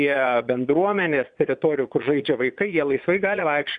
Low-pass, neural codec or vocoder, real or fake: 9.9 kHz; vocoder, 22.05 kHz, 80 mel bands, Vocos; fake